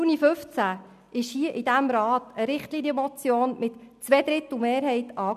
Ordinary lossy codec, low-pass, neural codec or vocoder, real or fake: none; 14.4 kHz; none; real